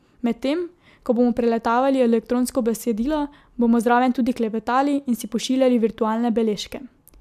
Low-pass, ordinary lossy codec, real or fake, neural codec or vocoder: 14.4 kHz; MP3, 96 kbps; real; none